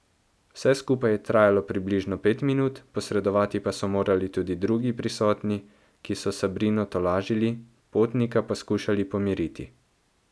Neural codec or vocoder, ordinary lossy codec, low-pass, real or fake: none; none; none; real